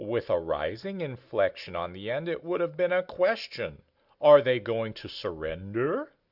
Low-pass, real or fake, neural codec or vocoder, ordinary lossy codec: 5.4 kHz; real; none; Opus, 64 kbps